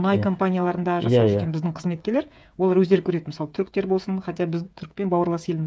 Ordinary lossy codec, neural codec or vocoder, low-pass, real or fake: none; codec, 16 kHz, 8 kbps, FreqCodec, smaller model; none; fake